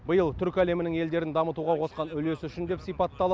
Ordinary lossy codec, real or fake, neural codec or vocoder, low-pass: none; real; none; none